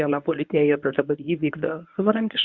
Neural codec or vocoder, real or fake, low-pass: codec, 24 kHz, 0.9 kbps, WavTokenizer, medium speech release version 1; fake; 7.2 kHz